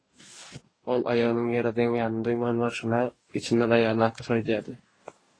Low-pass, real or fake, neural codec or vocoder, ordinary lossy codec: 9.9 kHz; fake; codec, 44.1 kHz, 2.6 kbps, DAC; AAC, 32 kbps